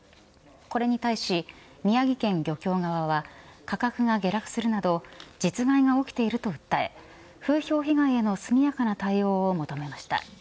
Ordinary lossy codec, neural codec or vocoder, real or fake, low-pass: none; none; real; none